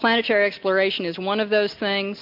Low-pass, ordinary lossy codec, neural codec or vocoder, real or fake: 5.4 kHz; MP3, 48 kbps; none; real